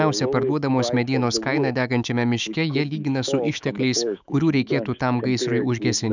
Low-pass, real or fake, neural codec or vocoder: 7.2 kHz; fake; autoencoder, 48 kHz, 128 numbers a frame, DAC-VAE, trained on Japanese speech